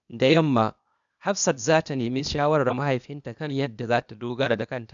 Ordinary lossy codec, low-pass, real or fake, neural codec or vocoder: MP3, 96 kbps; 7.2 kHz; fake; codec, 16 kHz, 0.8 kbps, ZipCodec